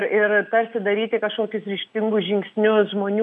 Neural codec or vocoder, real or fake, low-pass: none; real; 10.8 kHz